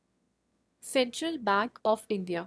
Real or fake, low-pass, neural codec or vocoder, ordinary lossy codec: fake; none; autoencoder, 22.05 kHz, a latent of 192 numbers a frame, VITS, trained on one speaker; none